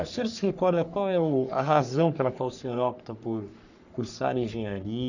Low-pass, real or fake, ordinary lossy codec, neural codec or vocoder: 7.2 kHz; fake; none; codec, 44.1 kHz, 3.4 kbps, Pupu-Codec